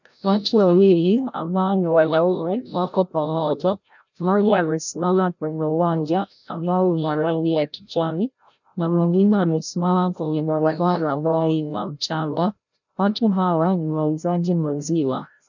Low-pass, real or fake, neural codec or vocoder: 7.2 kHz; fake; codec, 16 kHz, 0.5 kbps, FreqCodec, larger model